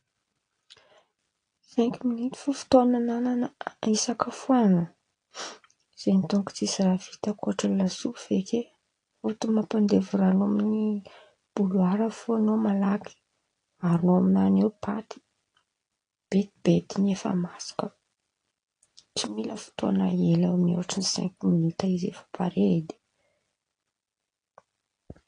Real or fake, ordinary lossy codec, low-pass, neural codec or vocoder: real; AAC, 48 kbps; 9.9 kHz; none